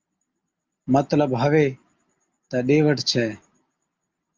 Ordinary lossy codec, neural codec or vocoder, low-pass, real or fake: Opus, 24 kbps; none; 7.2 kHz; real